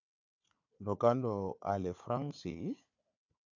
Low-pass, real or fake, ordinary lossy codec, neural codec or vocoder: 7.2 kHz; fake; AAC, 48 kbps; codec, 16 kHz, 4 kbps, FunCodec, trained on Chinese and English, 50 frames a second